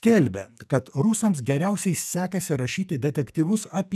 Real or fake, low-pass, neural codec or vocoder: fake; 14.4 kHz; codec, 44.1 kHz, 2.6 kbps, SNAC